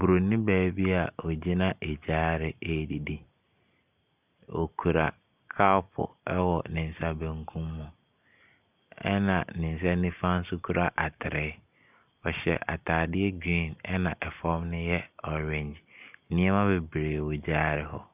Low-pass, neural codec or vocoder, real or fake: 3.6 kHz; none; real